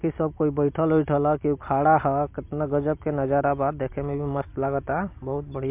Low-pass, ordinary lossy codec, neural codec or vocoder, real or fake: 3.6 kHz; MP3, 32 kbps; vocoder, 44.1 kHz, 128 mel bands every 256 samples, BigVGAN v2; fake